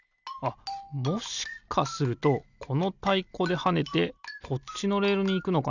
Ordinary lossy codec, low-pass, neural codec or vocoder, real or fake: none; 7.2 kHz; none; real